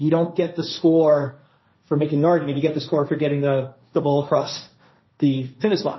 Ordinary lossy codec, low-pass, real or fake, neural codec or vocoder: MP3, 24 kbps; 7.2 kHz; fake; codec, 16 kHz, 1.1 kbps, Voila-Tokenizer